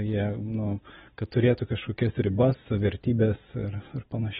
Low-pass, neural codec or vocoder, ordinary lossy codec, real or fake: 19.8 kHz; none; AAC, 16 kbps; real